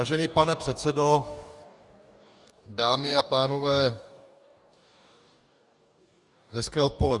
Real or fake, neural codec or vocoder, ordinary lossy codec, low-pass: fake; codec, 44.1 kHz, 2.6 kbps, DAC; Opus, 64 kbps; 10.8 kHz